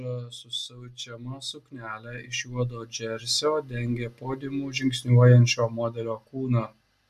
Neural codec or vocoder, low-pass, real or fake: none; 14.4 kHz; real